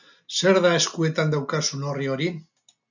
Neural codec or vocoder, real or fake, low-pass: none; real; 7.2 kHz